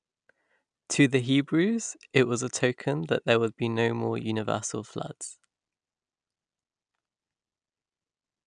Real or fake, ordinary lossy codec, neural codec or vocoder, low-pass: real; none; none; 9.9 kHz